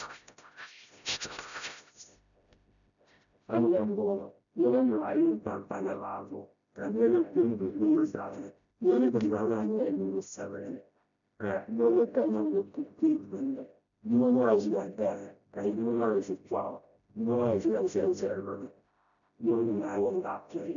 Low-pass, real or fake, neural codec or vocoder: 7.2 kHz; fake; codec, 16 kHz, 0.5 kbps, FreqCodec, smaller model